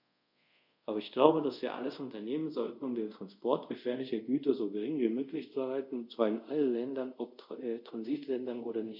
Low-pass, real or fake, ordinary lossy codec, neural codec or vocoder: 5.4 kHz; fake; none; codec, 24 kHz, 0.5 kbps, DualCodec